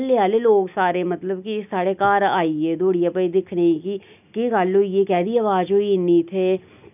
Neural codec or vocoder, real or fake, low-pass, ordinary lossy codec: none; real; 3.6 kHz; none